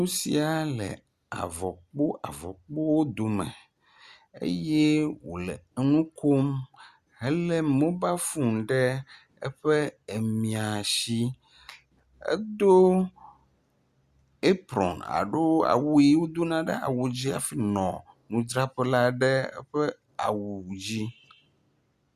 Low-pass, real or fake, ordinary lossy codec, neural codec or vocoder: 14.4 kHz; real; Opus, 64 kbps; none